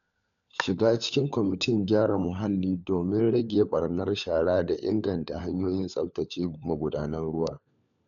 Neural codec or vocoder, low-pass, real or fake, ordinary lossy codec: codec, 16 kHz, 4 kbps, FunCodec, trained on LibriTTS, 50 frames a second; 7.2 kHz; fake; none